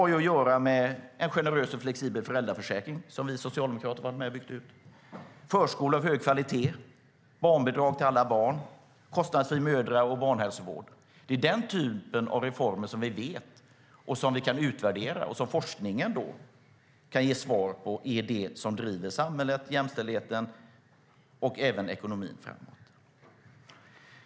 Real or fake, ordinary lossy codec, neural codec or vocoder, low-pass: real; none; none; none